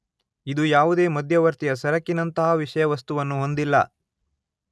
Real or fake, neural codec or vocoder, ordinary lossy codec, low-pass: real; none; none; none